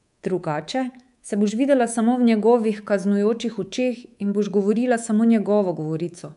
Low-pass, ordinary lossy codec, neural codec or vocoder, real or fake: 10.8 kHz; none; codec, 24 kHz, 3.1 kbps, DualCodec; fake